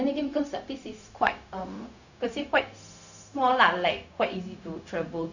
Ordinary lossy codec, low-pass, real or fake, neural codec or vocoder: none; 7.2 kHz; fake; codec, 16 kHz, 0.4 kbps, LongCat-Audio-Codec